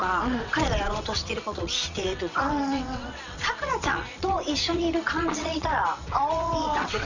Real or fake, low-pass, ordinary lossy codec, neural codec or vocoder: fake; 7.2 kHz; none; vocoder, 22.05 kHz, 80 mel bands, WaveNeXt